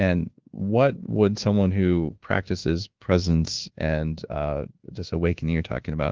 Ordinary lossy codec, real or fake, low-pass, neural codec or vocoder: Opus, 16 kbps; fake; 7.2 kHz; codec, 24 kHz, 1.2 kbps, DualCodec